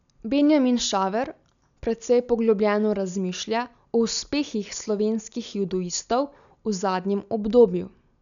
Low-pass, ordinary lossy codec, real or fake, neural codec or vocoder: 7.2 kHz; none; real; none